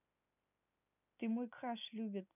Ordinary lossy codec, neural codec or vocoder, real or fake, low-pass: none; none; real; 3.6 kHz